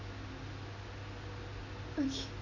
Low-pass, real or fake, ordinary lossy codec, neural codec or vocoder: 7.2 kHz; real; none; none